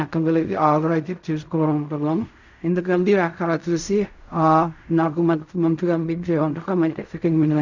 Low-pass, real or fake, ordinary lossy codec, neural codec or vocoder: 7.2 kHz; fake; none; codec, 16 kHz in and 24 kHz out, 0.4 kbps, LongCat-Audio-Codec, fine tuned four codebook decoder